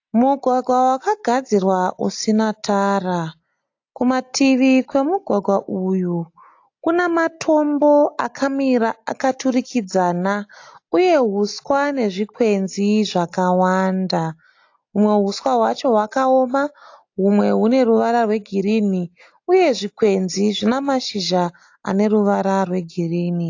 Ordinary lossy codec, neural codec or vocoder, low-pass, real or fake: AAC, 48 kbps; none; 7.2 kHz; real